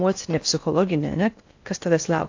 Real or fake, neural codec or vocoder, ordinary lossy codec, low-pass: fake; codec, 16 kHz in and 24 kHz out, 0.6 kbps, FocalCodec, streaming, 4096 codes; AAC, 48 kbps; 7.2 kHz